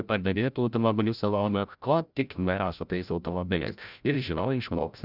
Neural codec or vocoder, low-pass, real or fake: codec, 16 kHz, 0.5 kbps, FreqCodec, larger model; 5.4 kHz; fake